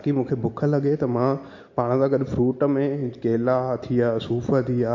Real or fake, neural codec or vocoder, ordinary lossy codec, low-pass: real; none; MP3, 48 kbps; 7.2 kHz